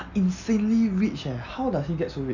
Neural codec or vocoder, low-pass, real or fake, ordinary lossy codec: none; 7.2 kHz; real; none